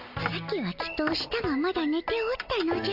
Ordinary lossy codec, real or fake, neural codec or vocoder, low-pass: AAC, 48 kbps; real; none; 5.4 kHz